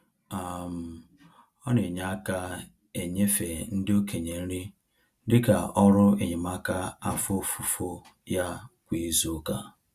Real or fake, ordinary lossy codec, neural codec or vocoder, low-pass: real; Opus, 64 kbps; none; 14.4 kHz